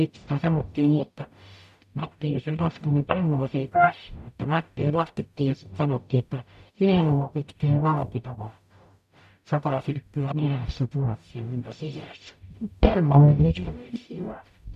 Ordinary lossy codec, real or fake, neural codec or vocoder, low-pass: none; fake; codec, 44.1 kHz, 0.9 kbps, DAC; 14.4 kHz